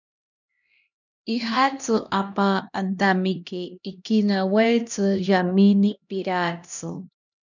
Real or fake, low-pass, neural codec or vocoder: fake; 7.2 kHz; codec, 16 kHz, 1 kbps, X-Codec, HuBERT features, trained on LibriSpeech